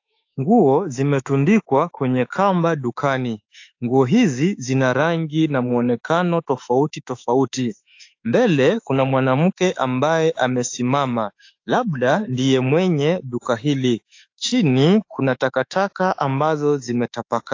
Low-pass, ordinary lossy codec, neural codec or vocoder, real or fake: 7.2 kHz; AAC, 48 kbps; autoencoder, 48 kHz, 32 numbers a frame, DAC-VAE, trained on Japanese speech; fake